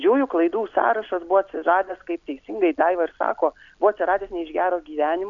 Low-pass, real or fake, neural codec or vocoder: 7.2 kHz; real; none